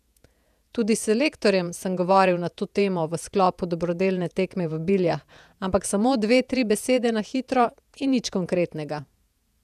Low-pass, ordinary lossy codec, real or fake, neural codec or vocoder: 14.4 kHz; none; fake; vocoder, 48 kHz, 128 mel bands, Vocos